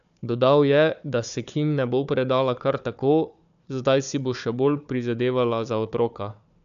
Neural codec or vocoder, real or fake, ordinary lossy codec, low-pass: codec, 16 kHz, 4 kbps, FunCodec, trained on Chinese and English, 50 frames a second; fake; none; 7.2 kHz